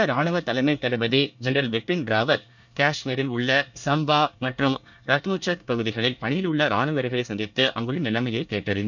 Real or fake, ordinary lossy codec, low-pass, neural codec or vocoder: fake; none; 7.2 kHz; codec, 24 kHz, 1 kbps, SNAC